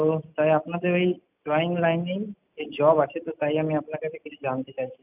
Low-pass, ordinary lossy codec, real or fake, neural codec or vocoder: 3.6 kHz; none; real; none